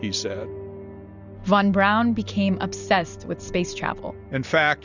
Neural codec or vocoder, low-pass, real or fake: none; 7.2 kHz; real